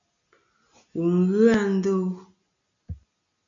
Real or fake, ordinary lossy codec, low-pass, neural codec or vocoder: real; MP3, 64 kbps; 7.2 kHz; none